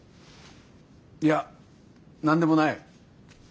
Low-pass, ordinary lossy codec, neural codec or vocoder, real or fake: none; none; none; real